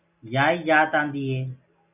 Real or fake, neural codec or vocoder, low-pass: real; none; 3.6 kHz